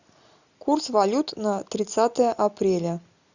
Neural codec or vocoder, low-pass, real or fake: none; 7.2 kHz; real